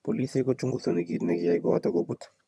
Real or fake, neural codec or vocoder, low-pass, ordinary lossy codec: fake; vocoder, 22.05 kHz, 80 mel bands, HiFi-GAN; none; none